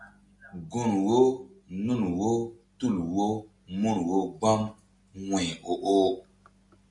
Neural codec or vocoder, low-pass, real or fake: none; 10.8 kHz; real